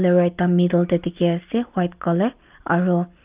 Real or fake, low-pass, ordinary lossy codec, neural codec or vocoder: real; 3.6 kHz; Opus, 24 kbps; none